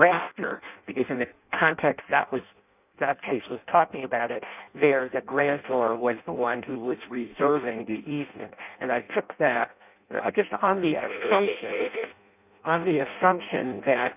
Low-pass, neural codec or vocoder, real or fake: 3.6 kHz; codec, 16 kHz in and 24 kHz out, 0.6 kbps, FireRedTTS-2 codec; fake